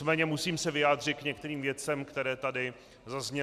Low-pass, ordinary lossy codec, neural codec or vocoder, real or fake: 14.4 kHz; MP3, 96 kbps; none; real